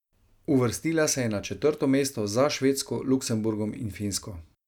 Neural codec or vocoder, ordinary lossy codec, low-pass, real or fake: none; none; 19.8 kHz; real